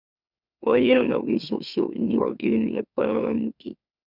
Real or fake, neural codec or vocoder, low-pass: fake; autoencoder, 44.1 kHz, a latent of 192 numbers a frame, MeloTTS; 5.4 kHz